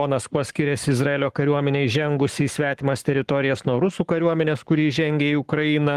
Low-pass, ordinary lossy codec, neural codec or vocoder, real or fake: 14.4 kHz; Opus, 24 kbps; codec, 44.1 kHz, 7.8 kbps, Pupu-Codec; fake